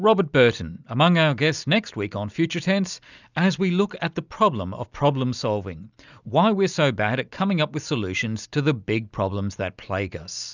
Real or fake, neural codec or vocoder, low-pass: real; none; 7.2 kHz